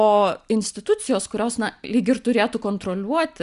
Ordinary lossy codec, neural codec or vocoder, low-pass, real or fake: Opus, 64 kbps; none; 10.8 kHz; real